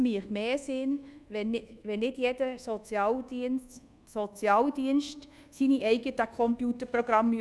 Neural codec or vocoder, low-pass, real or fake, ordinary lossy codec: codec, 24 kHz, 1.2 kbps, DualCodec; none; fake; none